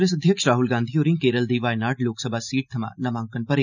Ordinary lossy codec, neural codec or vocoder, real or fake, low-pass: none; none; real; 7.2 kHz